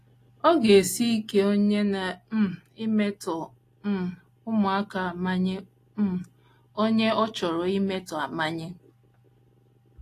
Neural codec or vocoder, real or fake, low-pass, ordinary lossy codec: none; real; 14.4 kHz; AAC, 48 kbps